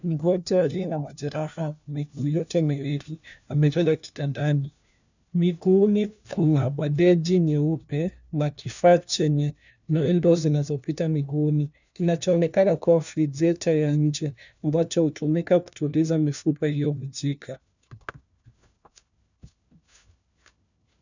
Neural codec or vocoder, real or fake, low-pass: codec, 16 kHz, 1 kbps, FunCodec, trained on LibriTTS, 50 frames a second; fake; 7.2 kHz